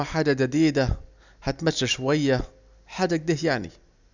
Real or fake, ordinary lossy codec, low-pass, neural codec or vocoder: real; none; 7.2 kHz; none